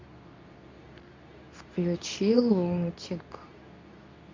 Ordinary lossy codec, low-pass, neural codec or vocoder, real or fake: MP3, 64 kbps; 7.2 kHz; codec, 24 kHz, 0.9 kbps, WavTokenizer, medium speech release version 2; fake